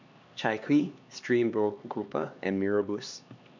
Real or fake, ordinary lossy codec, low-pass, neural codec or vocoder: fake; none; 7.2 kHz; codec, 16 kHz, 2 kbps, X-Codec, HuBERT features, trained on LibriSpeech